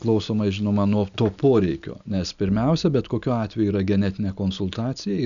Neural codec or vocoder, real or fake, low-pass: none; real; 7.2 kHz